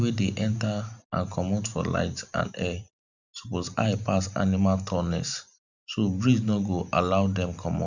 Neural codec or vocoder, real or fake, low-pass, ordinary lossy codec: vocoder, 44.1 kHz, 128 mel bands every 512 samples, BigVGAN v2; fake; 7.2 kHz; none